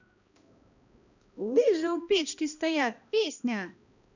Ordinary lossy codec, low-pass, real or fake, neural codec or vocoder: none; 7.2 kHz; fake; codec, 16 kHz, 1 kbps, X-Codec, HuBERT features, trained on balanced general audio